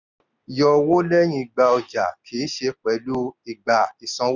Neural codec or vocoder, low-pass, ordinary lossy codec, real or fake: none; 7.2 kHz; none; real